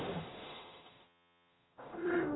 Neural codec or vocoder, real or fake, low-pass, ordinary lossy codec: codec, 44.1 kHz, 0.9 kbps, DAC; fake; 7.2 kHz; AAC, 16 kbps